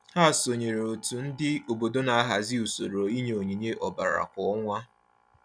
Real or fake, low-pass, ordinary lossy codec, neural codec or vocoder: real; 9.9 kHz; none; none